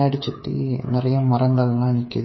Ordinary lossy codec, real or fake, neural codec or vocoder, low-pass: MP3, 24 kbps; fake; codec, 16 kHz, 8 kbps, FreqCodec, smaller model; 7.2 kHz